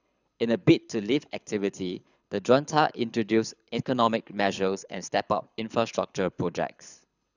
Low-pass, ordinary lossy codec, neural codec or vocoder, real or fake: 7.2 kHz; none; codec, 24 kHz, 6 kbps, HILCodec; fake